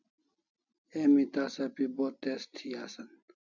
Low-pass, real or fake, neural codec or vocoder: 7.2 kHz; real; none